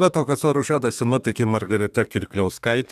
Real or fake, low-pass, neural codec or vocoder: fake; 14.4 kHz; codec, 32 kHz, 1.9 kbps, SNAC